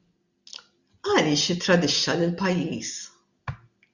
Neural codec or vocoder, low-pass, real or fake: none; 7.2 kHz; real